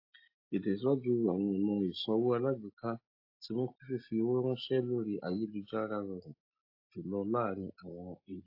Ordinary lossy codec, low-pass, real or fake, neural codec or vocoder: AAC, 32 kbps; 5.4 kHz; real; none